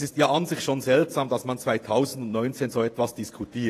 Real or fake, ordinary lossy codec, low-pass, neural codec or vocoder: fake; AAC, 48 kbps; 14.4 kHz; vocoder, 48 kHz, 128 mel bands, Vocos